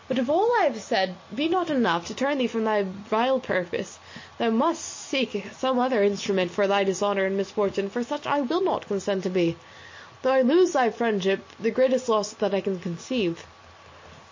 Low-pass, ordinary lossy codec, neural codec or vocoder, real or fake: 7.2 kHz; MP3, 32 kbps; none; real